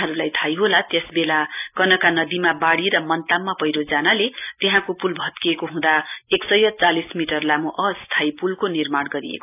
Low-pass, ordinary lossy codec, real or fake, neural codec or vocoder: 3.6 kHz; AAC, 32 kbps; real; none